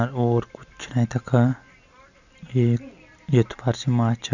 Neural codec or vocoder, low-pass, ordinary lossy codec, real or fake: none; 7.2 kHz; none; real